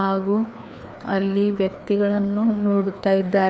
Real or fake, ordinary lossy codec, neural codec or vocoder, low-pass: fake; none; codec, 16 kHz, 2 kbps, FreqCodec, larger model; none